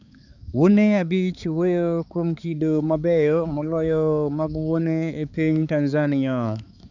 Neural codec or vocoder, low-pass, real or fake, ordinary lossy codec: codec, 16 kHz, 4 kbps, X-Codec, HuBERT features, trained on balanced general audio; 7.2 kHz; fake; none